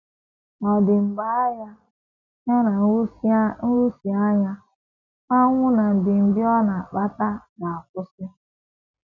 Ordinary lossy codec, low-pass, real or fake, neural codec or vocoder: none; 7.2 kHz; real; none